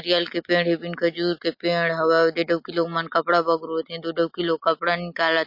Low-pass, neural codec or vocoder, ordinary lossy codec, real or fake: 5.4 kHz; none; MP3, 32 kbps; real